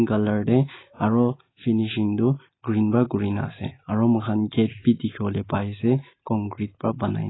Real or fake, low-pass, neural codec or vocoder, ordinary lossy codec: real; 7.2 kHz; none; AAC, 16 kbps